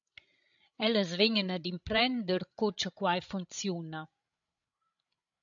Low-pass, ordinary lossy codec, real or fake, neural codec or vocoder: 7.2 kHz; AAC, 64 kbps; fake; codec, 16 kHz, 16 kbps, FreqCodec, larger model